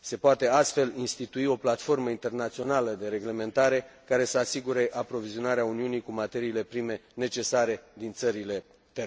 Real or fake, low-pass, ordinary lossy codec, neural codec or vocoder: real; none; none; none